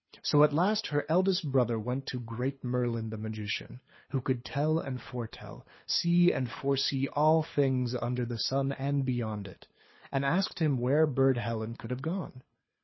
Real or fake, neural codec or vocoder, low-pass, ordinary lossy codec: fake; codec, 24 kHz, 6 kbps, HILCodec; 7.2 kHz; MP3, 24 kbps